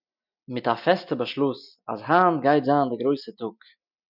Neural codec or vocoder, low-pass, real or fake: none; 5.4 kHz; real